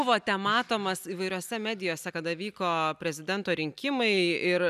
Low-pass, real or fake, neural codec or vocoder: 14.4 kHz; real; none